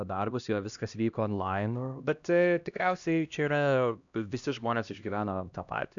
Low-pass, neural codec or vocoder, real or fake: 7.2 kHz; codec, 16 kHz, 1 kbps, X-Codec, HuBERT features, trained on LibriSpeech; fake